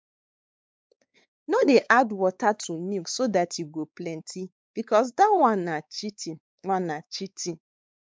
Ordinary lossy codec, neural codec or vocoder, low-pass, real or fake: none; codec, 16 kHz, 4 kbps, X-Codec, WavLM features, trained on Multilingual LibriSpeech; none; fake